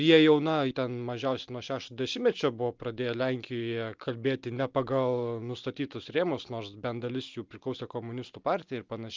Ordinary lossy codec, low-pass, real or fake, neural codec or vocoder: Opus, 24 kbps; 7.2 kHz; real; none